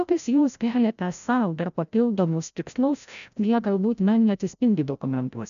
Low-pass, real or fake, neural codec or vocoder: 7.2 kHz; fake; codec, 16 kHz, 0.5 kbps, FreqCodec, larger model